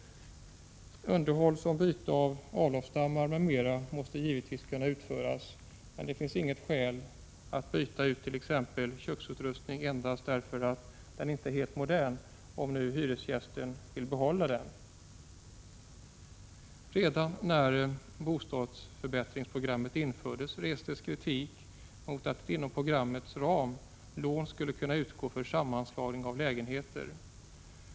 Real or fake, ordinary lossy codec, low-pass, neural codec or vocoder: real; none; none; none